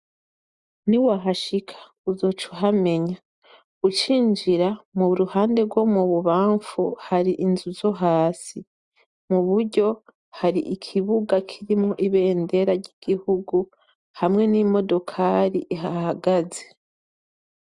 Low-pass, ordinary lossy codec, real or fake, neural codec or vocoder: 10.8 kHz; Opus, 64 kbps; real; none